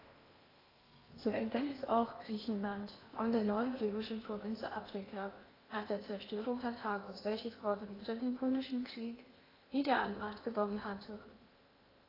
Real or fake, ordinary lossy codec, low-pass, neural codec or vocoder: fake; AAC, 24 kbps; 5.4 kHz; codec, 16 kHz in and 24 kHz out, 0.8 kbps, FocalCodec, streaming, 65536 codes